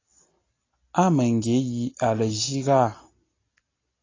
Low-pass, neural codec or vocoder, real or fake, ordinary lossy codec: 7.2 kHz; none; real; AAC, 32 kbps